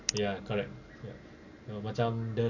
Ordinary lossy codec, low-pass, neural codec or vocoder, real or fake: none; 7.2 kHz; none; real